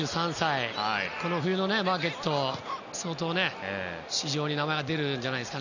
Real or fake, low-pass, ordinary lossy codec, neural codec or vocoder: real; 7.2 kHz; none; none